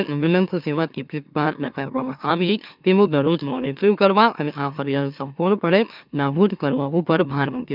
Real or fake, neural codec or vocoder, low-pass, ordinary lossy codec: fake; autoencoder, 44.1 kHz, a latent of 192 numbers a frame, MeloTTS; 5.4 kHz; none